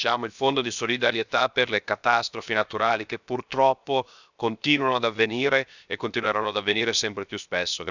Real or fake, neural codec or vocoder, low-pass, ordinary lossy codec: fake; codec, 16 kHz, 0.7 kbps, FocalCodec; 7.2 kHz; none